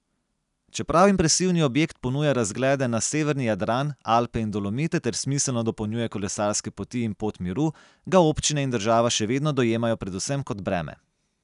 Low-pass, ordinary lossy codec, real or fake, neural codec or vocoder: 10.8 kHz; none; real; none